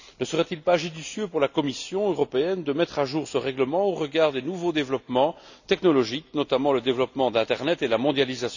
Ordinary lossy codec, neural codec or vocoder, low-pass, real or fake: none; none; 7.2 kHz; real